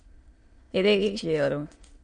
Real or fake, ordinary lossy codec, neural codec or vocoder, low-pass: fake; MP3, 48 kbps; autoencoder, 22.05 kHz, a latent of 192 numbers a frame, VITS, trained on many speakers; 9.9 kHz